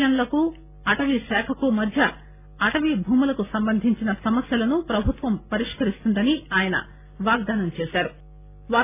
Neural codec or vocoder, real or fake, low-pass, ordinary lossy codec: none; real; 3.6 kHz; none